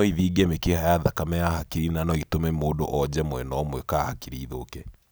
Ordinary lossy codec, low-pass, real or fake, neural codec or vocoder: none; none; real; none